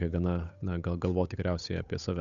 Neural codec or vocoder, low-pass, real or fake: codec, 16 kHz, 8 kbps, FunCodec, trained on Chinese and English, 25 frames a second; 7.2 kHz; fake